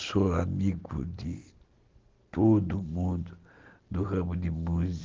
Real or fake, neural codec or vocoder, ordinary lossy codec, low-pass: real; none; Opus, 16 kbps; 7.2 kHz